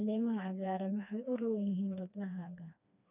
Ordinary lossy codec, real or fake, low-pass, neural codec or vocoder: none; fake; 3.6 kHz; codec, 16 kHz, 2 kbps, FreqCodec, smaller model